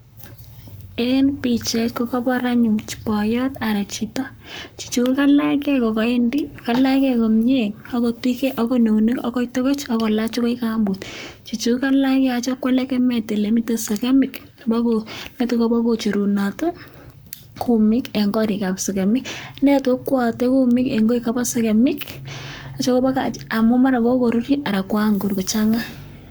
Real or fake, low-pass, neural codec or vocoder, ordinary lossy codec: fake; none; codec, 44.1 kHz, 7.8 kbps, Pupu-Codec; none